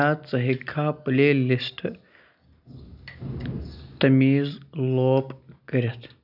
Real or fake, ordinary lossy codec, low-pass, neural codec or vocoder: real; none; 5.4 kHz; none